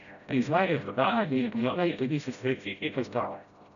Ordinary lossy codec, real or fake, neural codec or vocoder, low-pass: none; fake; codec, 16 kHz, 0.5 kbps, FreqCodec, smaller model; 7.2 kHz